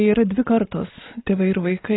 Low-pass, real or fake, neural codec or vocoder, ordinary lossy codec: 7.2 kHz; real; none; AAC, 16 kbps